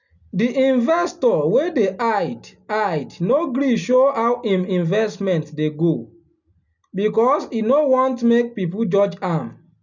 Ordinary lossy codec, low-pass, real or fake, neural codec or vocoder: none; 7.2 kHz; real; none